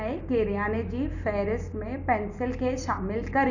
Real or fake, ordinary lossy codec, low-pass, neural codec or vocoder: real; none; 7.2 kHz; none